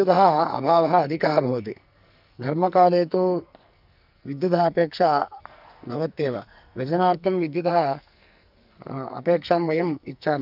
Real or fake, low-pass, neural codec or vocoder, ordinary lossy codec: fake; 5.4 kHz; codec, 44.1 kHz, 2.6 kbps, SNAC; none